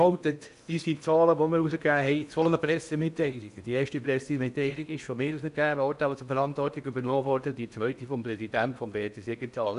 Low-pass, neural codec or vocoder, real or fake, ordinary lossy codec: 10.8 kHz; codec, 16 kHz in and 24 kHz out, 0.8 kbps, FocalCodec, streaming, 65536 codes; fake; none